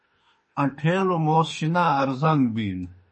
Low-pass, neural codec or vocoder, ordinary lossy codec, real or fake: 10.8 kHz; autoencoder, 48 kHz, 32 numbers a frame, DAC-VAE, trained on Japanese speech; MP3, 32 kbps; fake